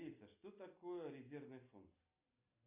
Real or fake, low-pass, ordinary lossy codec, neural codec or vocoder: real; 3.6 kHz; MP3, 32 kbps; none